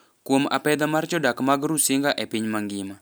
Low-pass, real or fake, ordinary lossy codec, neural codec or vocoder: none; real; none; none